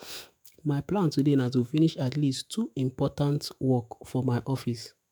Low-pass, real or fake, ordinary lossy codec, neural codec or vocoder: none; fake; none; autoencoder, 48 kHz, 128 numbers a frame, DAC-VAE, trained on Japanese speech